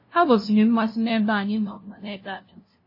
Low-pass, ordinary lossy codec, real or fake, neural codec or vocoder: 5.4 kHz; MP3, 24 kbps; fake; codec, 16 kHz, 0.5 kbps, FunCodec, trained on LibriTTS, 25 frames a second